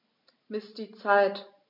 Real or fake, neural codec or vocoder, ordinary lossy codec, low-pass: real; none; MP3, 48 kbps; 5.4 kHz